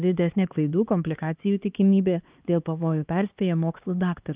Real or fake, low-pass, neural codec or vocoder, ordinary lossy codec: fake; 3.6 kHz; codec, 16 kHz, 2 kbps, X-Codec, HuBERT features, trained on LibriSpeech; Opus, 24 kbps